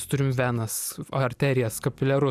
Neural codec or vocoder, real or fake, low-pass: none; real; 14.4 kHz